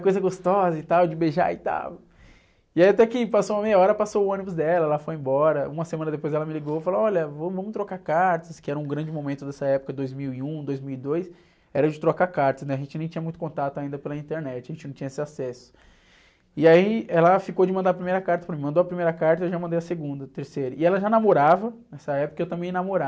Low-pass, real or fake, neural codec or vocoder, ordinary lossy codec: none; real; none; none